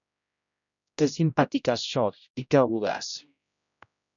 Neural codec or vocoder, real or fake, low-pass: codec, 16 kHz, 0.5 kbps, X-Codec, HuBERT features, trained on general audio; fake; 7.2 kHz